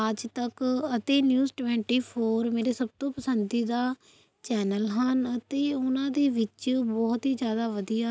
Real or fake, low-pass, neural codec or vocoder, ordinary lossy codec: real; none; none; none